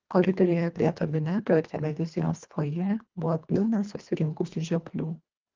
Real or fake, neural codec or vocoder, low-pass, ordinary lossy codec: fake; codec, 24 kHz, 1.5 kbps, HILCodec; 7.2 kHz; Opus, 32 kbps